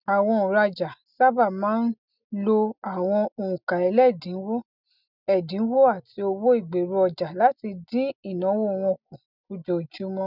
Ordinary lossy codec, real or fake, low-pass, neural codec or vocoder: none; real; 5.4 kHz; none